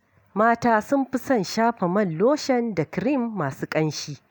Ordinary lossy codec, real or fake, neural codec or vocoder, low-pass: none; real; none; none